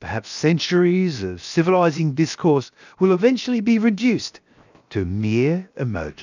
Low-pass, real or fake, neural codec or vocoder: 7.2 kHz; fake; codec, 16 kHz, 0.7 kbps, FocalCodec